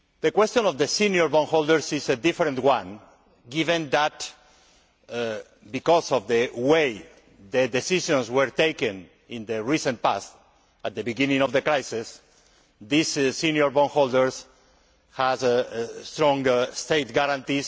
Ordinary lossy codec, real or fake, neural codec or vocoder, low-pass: none; real; none; none